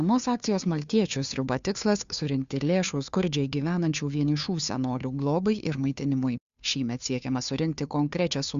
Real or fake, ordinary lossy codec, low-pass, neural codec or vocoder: fake; Opus, 64 kbps; 7.2 kHz; codec, 16 kHz, 2 kbps, FunCodec, trained on Chinese and English, 25 frames a second